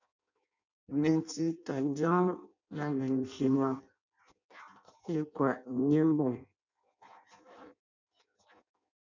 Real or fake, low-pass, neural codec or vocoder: fake; 7.2 kHz; codec, 16 kHz in and 24 kHz out, 0.6 kbps, FireRedTTS-2 codec